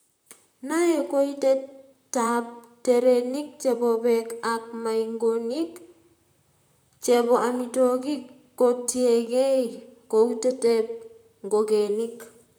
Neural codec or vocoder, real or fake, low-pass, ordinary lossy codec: vocoder, 44.1 kHz, 128 mel bands, Pupu-Vocoder; fake; none; none